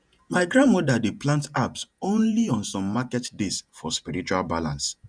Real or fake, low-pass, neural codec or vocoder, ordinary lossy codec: fake; 9.9 kHz; vocoder, 48 kHz, 128 mel bands, Vocos; none